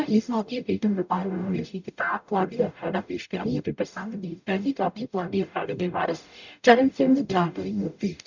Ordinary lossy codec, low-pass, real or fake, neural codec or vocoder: none; 7.2 kHz; fake; codec, 44.1 kHz, 0.9 kbps, DAC